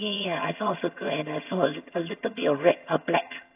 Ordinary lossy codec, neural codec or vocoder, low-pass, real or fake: AAC, 32 kbps; vocoder, 22.05 kHz, 80 mel bands, HiFi-GAN; 3.6 kHz; fake